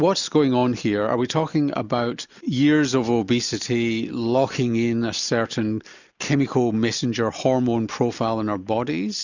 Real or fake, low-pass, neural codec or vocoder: real; 7.2 kHz; none